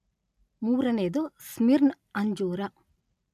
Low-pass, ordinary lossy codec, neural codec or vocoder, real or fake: 14.4 kHz; none; none; real